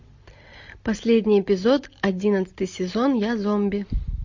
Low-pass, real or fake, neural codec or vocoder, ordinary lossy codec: 7.2 kHz; real; none; MP3, 48 kbps